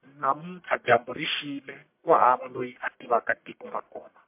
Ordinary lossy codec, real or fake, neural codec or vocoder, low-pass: MP3, 32 kbps; fake; codec, 44.1 kHz, 1.7 kbps, Pupu-Codec; 3.6 kHz